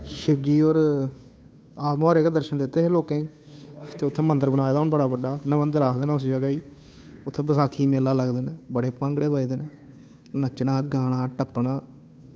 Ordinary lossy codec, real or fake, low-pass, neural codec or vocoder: none; fake; none; codec, 16 kHz, 2 kbps, FunCodec, trained on Chinese and English, 25 frames a second